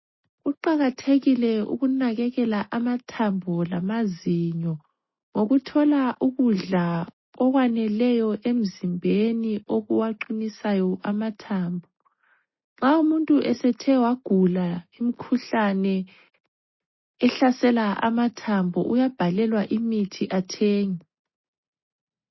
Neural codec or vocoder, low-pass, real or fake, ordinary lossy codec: none; 7.2 kHz; real; MP3, 24 kbps